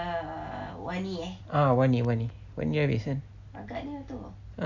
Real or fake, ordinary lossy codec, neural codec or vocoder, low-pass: real; none; none; 7.2 kHz